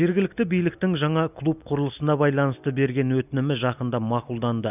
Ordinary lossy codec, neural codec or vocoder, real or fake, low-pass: none; none; real; 3.6 kHz